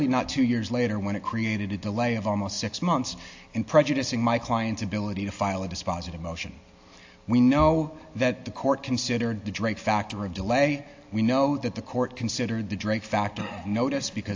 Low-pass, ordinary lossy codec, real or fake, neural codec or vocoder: 7.2 kHz; AAC, 48 kbps; real; none